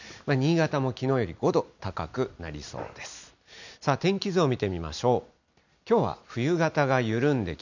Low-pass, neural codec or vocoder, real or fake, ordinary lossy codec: 7.2 kHz; none; real; none